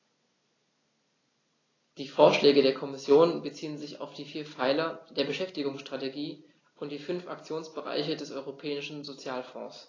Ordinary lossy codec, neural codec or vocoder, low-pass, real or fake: AAC, 32 kbps; none; 7.2 kHz; real